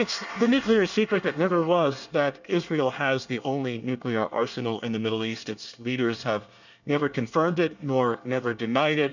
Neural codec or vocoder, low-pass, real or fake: codec, 24 kHz, 1 kbps, SNAC; 7.2 kHz; fake